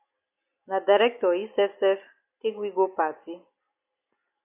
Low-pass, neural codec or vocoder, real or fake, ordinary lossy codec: 3.6 kHz; none; real; AAC, 32 kbps